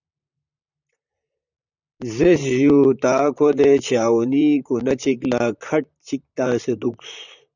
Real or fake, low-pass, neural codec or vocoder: fake; 7.2 kHz; vocoder, 44.1 kHz, 128 mel bands, Pupu-Vocoder